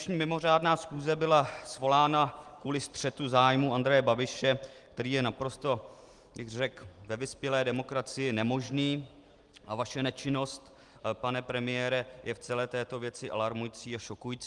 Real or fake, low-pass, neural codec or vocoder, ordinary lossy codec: real; 10.8 kHz; none; Opus, 24 kbps